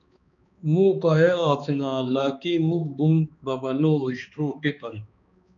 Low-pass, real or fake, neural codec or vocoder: 7.2 kHz; fake; codec, 16 kHz, 2 kbps, X-Codec, HuBERT features, trained on balanced general audio